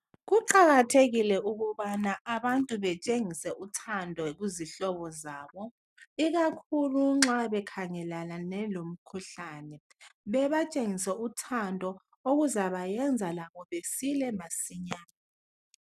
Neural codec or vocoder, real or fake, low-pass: none; real; 14.4 kHz